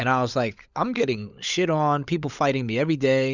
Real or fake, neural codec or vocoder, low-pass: fake; codec, 16 kHz, 16 kbps, FunCodec, trained on LibriTTS, 50 frames a second; 7.2 kHz